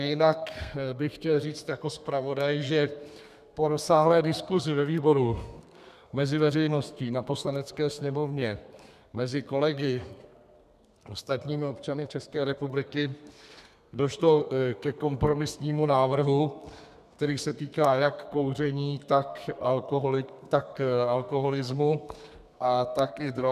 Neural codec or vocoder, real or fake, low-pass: codec, 32 kHz, 1.9 kbps, SNAC; fake; 14.4 kHz